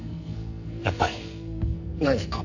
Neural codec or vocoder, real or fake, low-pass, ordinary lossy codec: codec, 44.1 kHz, 2.6 kbps, SNAC; fake; 7.2 kHz; none